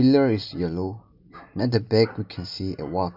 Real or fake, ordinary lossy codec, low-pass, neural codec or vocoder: real; none; 5.4 kHz; none